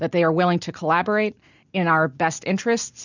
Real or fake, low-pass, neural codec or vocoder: real; 7.2 kHz; none